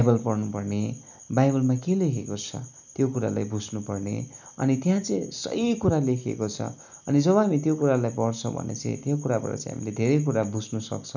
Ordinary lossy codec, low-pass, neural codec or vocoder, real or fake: none; 7.2 kHz; none; real